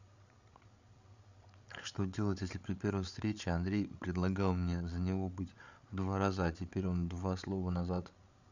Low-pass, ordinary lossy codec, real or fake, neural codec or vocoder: 7.2 kHz; none; fake; codec, 16 kHz, 8 kbps, FreqCodec, larger model